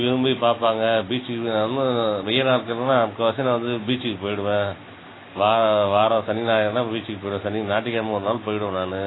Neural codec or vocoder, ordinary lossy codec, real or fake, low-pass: none; AAC, 16 kbps; real; 7.2 kHz